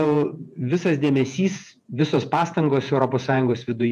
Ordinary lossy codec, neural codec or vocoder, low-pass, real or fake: AAC, 96 kbps; vocoder, 48 kHz, 128 mel bands, Vocos; 14.4 kHz; fake